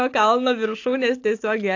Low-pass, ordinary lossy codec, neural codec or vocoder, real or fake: 7.2 kHz; AAC, 48 kbps; none; real